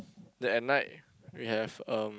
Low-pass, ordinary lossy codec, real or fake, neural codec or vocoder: none; none; real; none